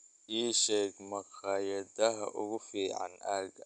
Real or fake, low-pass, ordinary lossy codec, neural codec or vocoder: real; 9.9 kHz; none; none